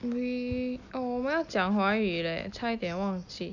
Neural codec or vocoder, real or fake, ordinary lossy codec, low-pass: none; real; none; 7.2 kHz